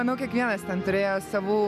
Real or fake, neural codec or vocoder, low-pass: real; none; 14.4 kHz